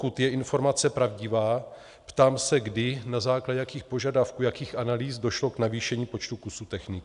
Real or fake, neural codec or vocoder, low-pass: real; none; 10.8 kHz